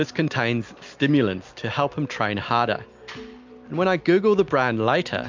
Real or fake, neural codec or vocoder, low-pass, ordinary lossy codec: real; none; 7.2 kHz; MP3, 64 kbps